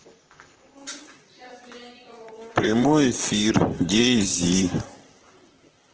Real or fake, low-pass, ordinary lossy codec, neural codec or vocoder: fake; 7.2 kHz; Opus, 16 kbps; vocoder, 44.1 kHz, 128 mel bands, Pupu-Vocoder